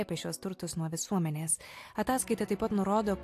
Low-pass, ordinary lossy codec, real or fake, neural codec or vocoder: 14.4 kHz; AAC, 64 kbps; real; none